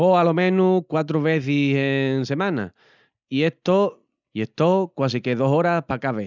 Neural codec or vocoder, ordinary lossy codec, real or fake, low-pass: none; none; real; 7.2 kHz